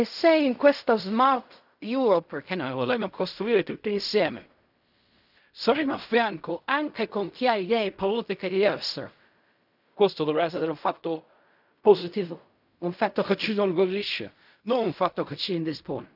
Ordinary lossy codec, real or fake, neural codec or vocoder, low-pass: none; fake; codec, 16 kHz in and 24 kHz out, 0.4 kbps, LongCat-Audio-Codec, fine tuned four codebook decoder; 5.4 kHz